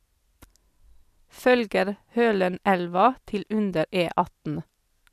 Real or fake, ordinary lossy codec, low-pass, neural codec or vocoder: real; none; 14.4 kHz; none